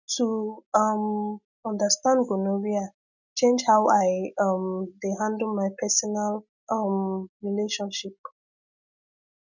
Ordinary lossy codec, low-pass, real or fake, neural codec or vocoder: none; 7.2 kHz; real; none